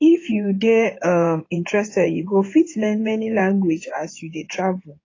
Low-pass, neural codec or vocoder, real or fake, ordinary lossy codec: 7.2 kHz; codec, 16 kHz in and 24 kHz out, 2.2 kbps, FireRedTTS-2 codec; fake; AAC, 32 kbps